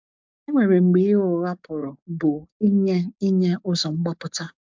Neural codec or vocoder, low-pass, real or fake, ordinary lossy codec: codec, 44.1 kHz, 7.8 kbps, DAC; 7.2 kHz; fake; MP3, 64 kbps